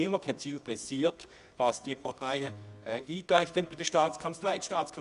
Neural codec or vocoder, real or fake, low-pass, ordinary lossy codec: codec, 24 kHz, 0.9 kbps, WavTokenizer, medium music audio release; fake; 10.8 kHz; none